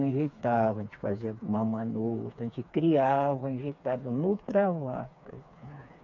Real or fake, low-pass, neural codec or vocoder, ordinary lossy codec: fake; 7.2 kHz; codec, 16 kHz, 4 kbps, FreqCodec, smaller model; none